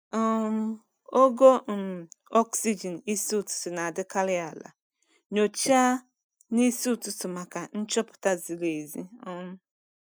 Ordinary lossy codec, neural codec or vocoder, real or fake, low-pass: none; none; real; none